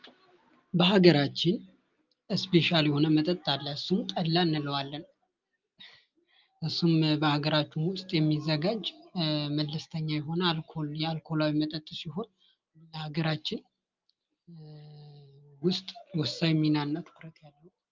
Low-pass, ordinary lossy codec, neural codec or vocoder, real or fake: 7.2 kHz; Opus, 24 kbps; none; real